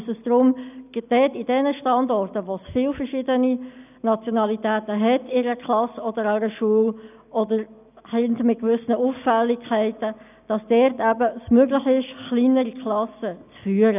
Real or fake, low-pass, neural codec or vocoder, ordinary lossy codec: real; 3.6 kHz; none; none